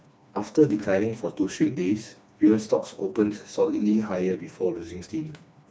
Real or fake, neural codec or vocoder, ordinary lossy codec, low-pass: fake; codec, 16 kHz, 2 kbps, FreqCodec, smaller model; none; none